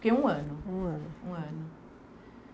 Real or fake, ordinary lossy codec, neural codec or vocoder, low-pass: real; none; none; none